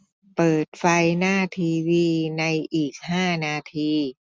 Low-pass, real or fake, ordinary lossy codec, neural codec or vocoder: none; real; none; none